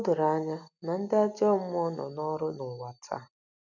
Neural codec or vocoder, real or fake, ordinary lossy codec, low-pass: none; real; none; 7.2 kHz